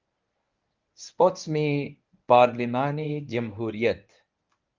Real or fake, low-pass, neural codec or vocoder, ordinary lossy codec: fake; 7.2 kHz; codec, 24 kHz, 0.9 kbps, WavTokenizer, medium speech release version 1; Opus, 24 kbps